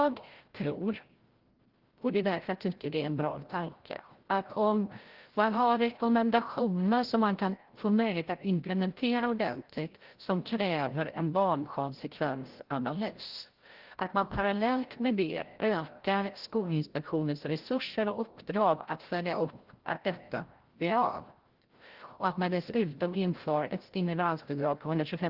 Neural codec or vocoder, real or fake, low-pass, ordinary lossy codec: codec, 16 kHz, 0.5 kbps, FreqCodec, larger model; fake; 5.4 kHz; Opus, 16 kbps